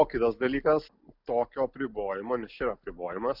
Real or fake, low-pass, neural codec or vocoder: real; 5.4 kHz; none